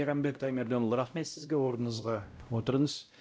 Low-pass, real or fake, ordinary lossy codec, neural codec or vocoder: none; fake; none; codec, 16 kHz, 0.5 kbps, X-Codec, WavLM features, trained on Multilingual LibriSpeech